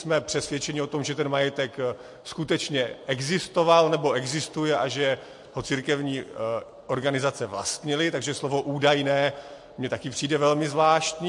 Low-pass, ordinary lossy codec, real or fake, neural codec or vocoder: 10.8 kHz; MP3, 48 kbps; real; none